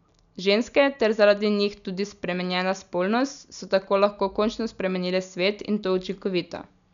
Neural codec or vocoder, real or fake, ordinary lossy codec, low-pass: none; real; none; 7.2 kHz